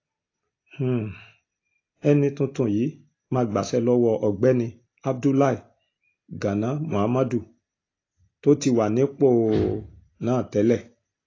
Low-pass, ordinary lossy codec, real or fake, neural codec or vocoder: 7.2 kHz; AAC, 32 kbps; real; none